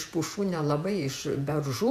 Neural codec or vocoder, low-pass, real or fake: vocoder, 48 kHz, 128 mel bands, Vocos; 14.4 kHz; fake